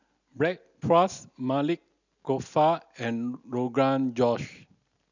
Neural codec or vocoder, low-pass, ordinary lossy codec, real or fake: none; 7.2 kHz; none; real